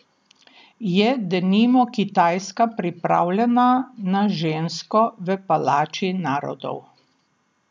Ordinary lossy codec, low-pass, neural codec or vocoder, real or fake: none; none; none; real